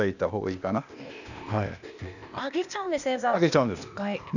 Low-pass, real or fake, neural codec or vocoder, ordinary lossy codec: 7.2 kHz; fake; codec, 16 kHz, 0.8 kbps, ZipCodec; none